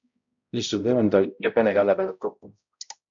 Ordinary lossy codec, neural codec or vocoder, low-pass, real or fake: MP3, 64 kbps; codec, 16 kHz, 0.5 kbps, X-Codec, HuBERT features, trained on balanced general audio; 7.2 kHz; fake